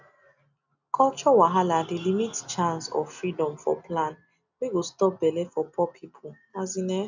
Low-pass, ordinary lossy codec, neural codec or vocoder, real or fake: 7.2 kHz; none; none; real